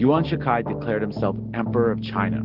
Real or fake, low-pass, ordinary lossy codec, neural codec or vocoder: real; 5.4 kHz; Opus, 16 kbps; none